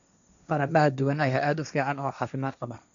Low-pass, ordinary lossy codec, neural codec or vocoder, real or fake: 7.2 kHz; none; codec, 16 kHz, 1.1 kbps, Voila-Tokenizer; fake